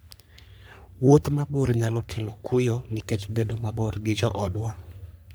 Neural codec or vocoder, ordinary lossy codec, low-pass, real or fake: codec, 44.1 kHz, 3.4 kbps, Pupu-Codec; none; none; fake